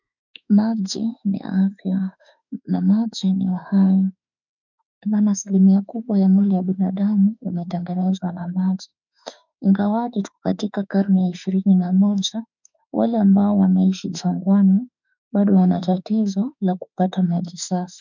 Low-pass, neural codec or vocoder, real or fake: 7.2 kHz; autoencoder, 48 kHz, 32 numbers a frame, DAC-VAE, trained on Japanese speech; fake